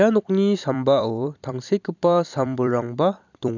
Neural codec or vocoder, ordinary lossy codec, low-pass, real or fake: vocoder, 44.1 kHz, 128 mel bands every 256 samples, BigVGAN v2; none; 7.2 kHz; fake